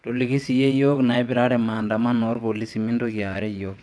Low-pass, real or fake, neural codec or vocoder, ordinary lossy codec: none; fake; vocoder, 22.05 kHz, 80 mel bands, WaveNeXt; none